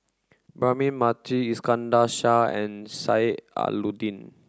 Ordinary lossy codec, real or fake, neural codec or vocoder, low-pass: none; real; none; none